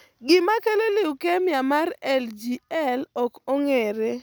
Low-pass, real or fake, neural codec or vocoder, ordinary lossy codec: none; real; none; none